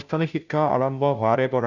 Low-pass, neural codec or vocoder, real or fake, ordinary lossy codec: 7.2 kHz; codec, 16 kHz, 0.5 kbps, FunCodec, trained on Chinese and English, 25 frames a second; fake; none